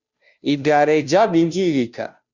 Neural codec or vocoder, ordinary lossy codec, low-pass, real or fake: codec, 16 kHz, 0.5 kbps, FunCodec, trained on Chinese and English, 25 frames a second; Opus, 64 kbps; 7.2 kHz; fake